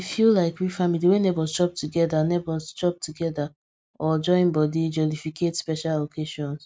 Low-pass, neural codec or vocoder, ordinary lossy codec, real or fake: none; none; none; real